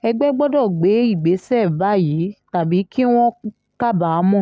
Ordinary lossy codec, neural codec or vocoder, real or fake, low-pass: none; none; real; none